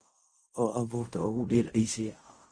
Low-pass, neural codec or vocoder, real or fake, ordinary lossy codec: 9.9 kHz; codec, 16 kHz in and 24 kHz out, 0.4 kbps, LongCat-Audio-Codec, fine tuned four codebook decoder; fake; Opus, 32 kbps